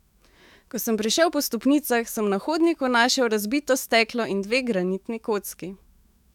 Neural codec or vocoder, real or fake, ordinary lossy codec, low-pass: autoencoder, 48 kHz, 128 numbers a frame, DAC-VAE, trained on Japanese speech; fake; none; 19.8 kHz